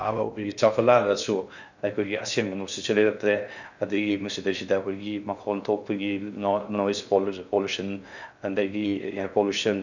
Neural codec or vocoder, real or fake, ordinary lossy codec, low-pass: codec, 16 kHz in and 24 kHz out, 0.6 kbps, FocalCodec, streaming, 2048 codes; fake; none; 7.2 kHz